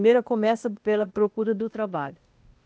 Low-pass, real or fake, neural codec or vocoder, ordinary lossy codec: none; fake; codec, 16 kHz, 0.8 kbps, ZipCodec; none